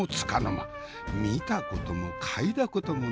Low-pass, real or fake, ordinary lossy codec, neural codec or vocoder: none; real; none; none